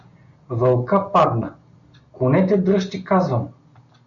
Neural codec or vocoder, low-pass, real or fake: none; 7.2 kHz; real